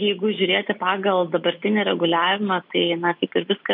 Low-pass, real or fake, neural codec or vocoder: 5.4 kHz; real; none